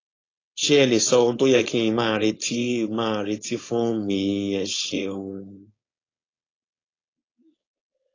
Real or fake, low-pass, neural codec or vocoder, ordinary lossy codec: fake; 7.2 kHz; codec, 16 kHz, 4.8 kbps, FACodec; AAC, 32 kbps